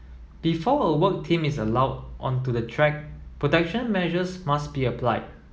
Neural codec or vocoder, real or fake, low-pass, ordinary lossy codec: none; real; none; none